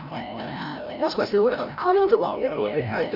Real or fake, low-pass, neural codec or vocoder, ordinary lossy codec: fake; 5.4 kHz; codec, 16 kHz, 0.5 kbps, FreqCodec, larger model; none